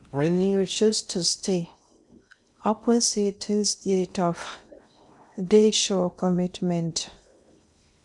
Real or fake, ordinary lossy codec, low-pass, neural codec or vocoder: fake; none; 10.8 kHz; codec, 16 kHz in and 24 kHz out, 0.6 kbps, FocalCodec, streaming, 4096 codes